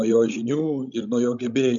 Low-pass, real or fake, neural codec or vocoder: 7.2 kHz; fake; codec, 16 kHz, 16 kbps, FreqCodec, larger model